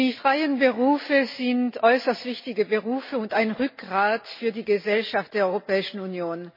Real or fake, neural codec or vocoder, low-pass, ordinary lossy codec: real; none; 5.4 kHz; MP3, 24 kbps